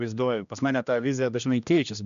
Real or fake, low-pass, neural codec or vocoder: fake; 7.2 kHz; codec, 16 kHz, 1 kbps, X-Codec, HuBERT features, trained on general audio